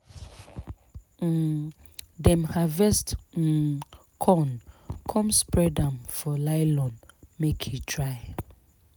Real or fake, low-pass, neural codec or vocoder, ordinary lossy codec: real; none; none; none